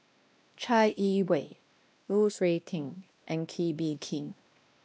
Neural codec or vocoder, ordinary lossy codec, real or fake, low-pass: codec, 16 kHz, 1 kbps, X-Codec, WavLM features, trained on Multilingual LibriSpeech; none; fake; none